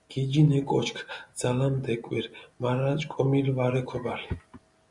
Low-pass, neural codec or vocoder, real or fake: 10.8 kHz; none; real